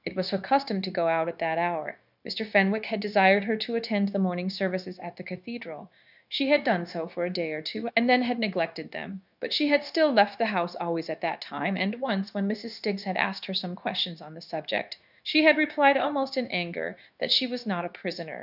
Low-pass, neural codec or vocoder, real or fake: 5.4 kHz; codec, 16 kHz, 0.9 kbps, LongCat-Audio-Codec; fake